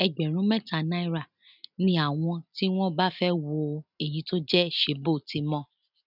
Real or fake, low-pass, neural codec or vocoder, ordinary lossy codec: real; 5.4 kHz; none; none